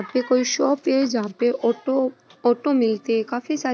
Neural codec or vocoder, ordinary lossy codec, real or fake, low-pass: none; none; real; none